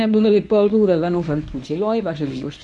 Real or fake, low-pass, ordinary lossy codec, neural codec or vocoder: fake; none; none; codec, 24 kHz, 0.9 kbps, WavTokenizer, medium speech release version 1